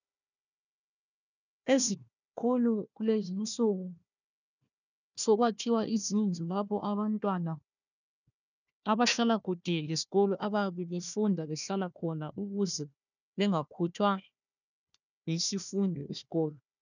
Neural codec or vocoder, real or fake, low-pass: codec, 16 kHz, 1 kbps, FunCodec, trained on Chinese and English, 50 frames a second; fake; 7.2 kHz